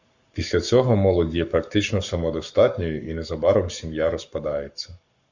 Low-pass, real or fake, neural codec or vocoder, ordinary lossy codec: 7.2 kHz; fake; codec, 44.1 kHz, 7.8 kbps, Pupu-Codec; Opus, 64 kbps